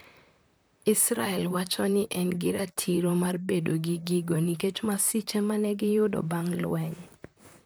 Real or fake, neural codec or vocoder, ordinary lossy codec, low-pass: fake; vocoder, 44.1 kHz, 128 mel bands, Pupu-Vocoder; none; none